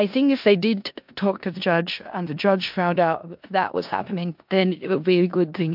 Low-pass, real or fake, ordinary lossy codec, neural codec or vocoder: 5.4 kHz; fake; MP3, 48 kbps; codec, 16 kHz in and 24 kHz out, 0.4 kbps, LongCat-Audio-Codec, four codebook decoder